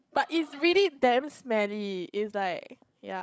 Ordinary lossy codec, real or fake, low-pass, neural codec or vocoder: none; fake; none; codec, 16 kHz, 16 kbps, FreqCodec, larger model